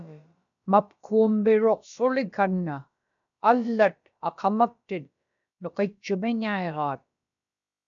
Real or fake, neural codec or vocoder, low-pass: fake; codec, 16 kHz, about 1 kbps, DyCAST, with the encoder's durations; 7.2 kHz